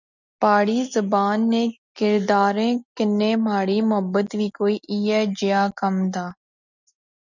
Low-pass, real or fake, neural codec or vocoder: 7.2 kHz; real; none